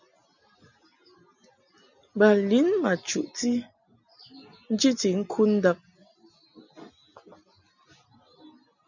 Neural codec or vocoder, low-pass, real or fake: none; 7.2 kHz; real